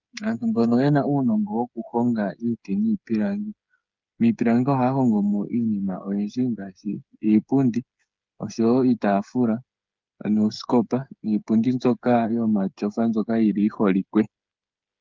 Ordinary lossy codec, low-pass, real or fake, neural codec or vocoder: Opus, 24 kbps; 7.2 kHz; fake; codec, 16 kHz, 8 kbps, FreqCodec, smaller model